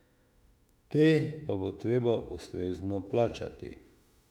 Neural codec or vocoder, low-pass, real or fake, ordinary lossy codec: autoencoder, 48 kHz, 32 numbers a frame, DAC-VAE, trained on Japanese speech; 19.8 kHz; fake; none